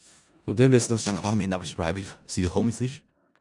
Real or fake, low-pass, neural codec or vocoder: fake; 10.8 kHz; codec, 16 kHz in and 24 kHz out, 0.4 kbps, LongCat-Audio-Codec, four codebook decoder